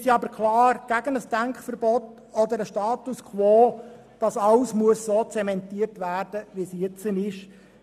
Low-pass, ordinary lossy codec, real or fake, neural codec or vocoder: 14.4 kHz; none; real; none